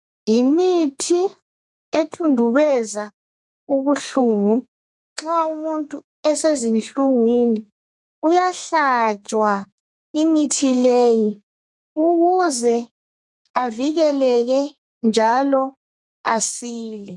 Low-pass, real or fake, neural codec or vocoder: 10.8 kHz; fake; codec, 32 kHz, 1.9 kbps, SNAC